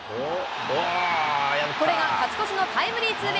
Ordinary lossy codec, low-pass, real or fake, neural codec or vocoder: none; none; real; none